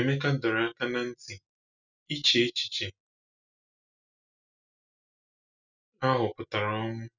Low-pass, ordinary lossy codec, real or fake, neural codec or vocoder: 7.2 kHz; none; real; none